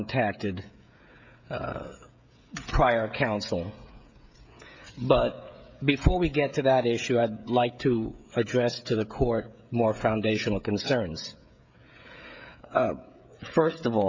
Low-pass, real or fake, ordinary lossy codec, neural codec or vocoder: 7.2 kHz; fake; MP3, 64 kbps; codec, 16 kHz, 16 kbps, FreqCodec, smaller model